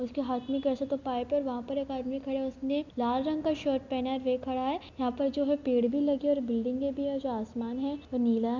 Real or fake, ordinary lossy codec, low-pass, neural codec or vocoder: real; none; 7.2 kHz; none